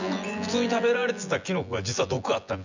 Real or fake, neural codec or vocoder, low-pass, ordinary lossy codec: fake; vocoder, 24 kHz, 100 mel bands, Vocos; 7.2 kHz; none